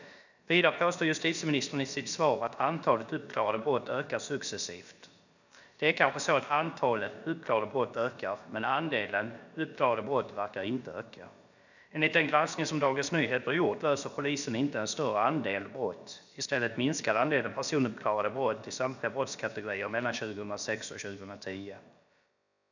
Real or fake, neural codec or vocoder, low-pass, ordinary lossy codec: fake; codec, 16 kHz, about 1 kbps, DyCAST, with the encoder's durations; 7.2 kHz; none